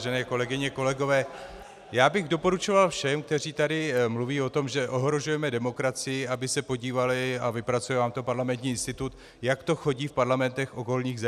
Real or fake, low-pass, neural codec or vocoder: real; 14.4 kHz; none